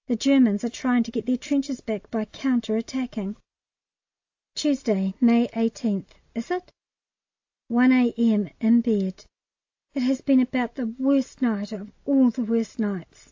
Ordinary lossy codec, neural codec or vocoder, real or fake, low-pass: AAC, 48 kbps; none; real; 7.2 kHz